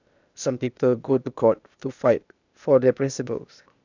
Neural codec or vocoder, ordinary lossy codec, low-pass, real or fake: codec, 16 kHz, 0.8 kbps, ZipCodec; none; 7.2 kHz; fake